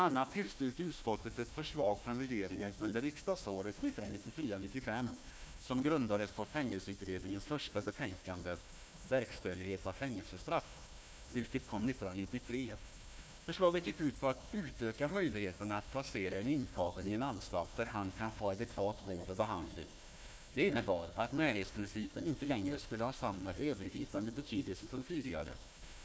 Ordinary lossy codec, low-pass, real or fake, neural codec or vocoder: none; none; fake; codec, 16 kHz, 1 kbps, FunCodec, trained on Chinese and English, 50 frames a second